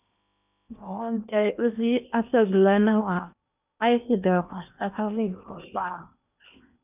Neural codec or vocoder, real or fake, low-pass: codec, 16 kHz in and 24 kHz out, 0.8 kbps, FocalCodec, streaming, 65536 codes; fake; 3.6 kHz